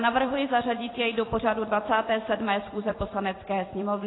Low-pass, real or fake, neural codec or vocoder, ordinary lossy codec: 7.2 kHz; fake; vocoder, 44.1 kHz, 128 mel bands every 256 samples, BigVGAN v2; AAC, 16 kbps